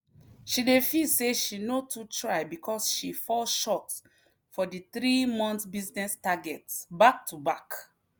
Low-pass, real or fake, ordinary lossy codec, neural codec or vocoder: none; real; none; none